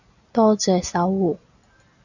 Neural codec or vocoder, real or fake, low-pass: none; real; 7.2 kHz